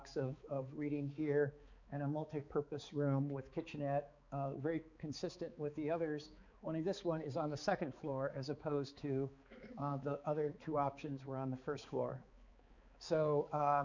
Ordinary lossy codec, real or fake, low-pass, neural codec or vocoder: Opus, 64 kbps; fake; 7.2 kHz; codec, 16 kHz, 4 kbps, X-Codec, HuBERT features, trained on balanced general audio